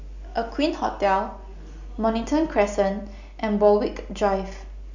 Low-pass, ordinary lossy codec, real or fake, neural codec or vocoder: 7.2 kHz; none; real; none